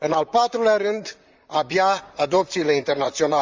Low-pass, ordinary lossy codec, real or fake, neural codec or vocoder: 7.2 kHz; Opus, 32 kbps; fake; vocoder, 44.1 kHz, 128 mel bands, Pupu-Vocoder